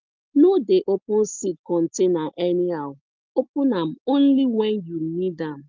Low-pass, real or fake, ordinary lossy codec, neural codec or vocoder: 7.2 kHz; real; Opus, 32 kbps; none